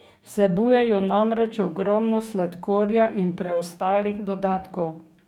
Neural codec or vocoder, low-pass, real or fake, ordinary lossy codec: codec, 44.1 kHz, 2.6 kbps, DAC; 19.8 kHz; fake; none